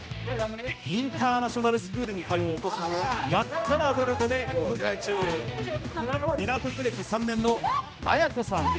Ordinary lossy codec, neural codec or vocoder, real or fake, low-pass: none; codec, 16 kHz, 1 kbps, X-Codec, HuBERT features, trained on general audio; fake; none